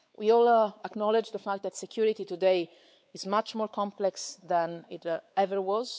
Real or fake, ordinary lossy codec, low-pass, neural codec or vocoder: fake; none; none; codec, 16 kHz, 4 kbps, X-Codec, WavLM features, trained on Multilingual LibriSpeech